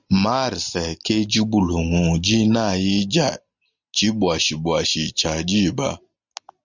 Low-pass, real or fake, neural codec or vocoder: 7.2 kHz; real; none